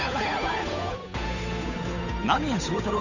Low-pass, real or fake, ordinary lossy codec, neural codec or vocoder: 7.2 kHz; fake; none; codec, 16 kHz, 8 kbps, FunCodec, trained on Chinese and English, 25 frames a second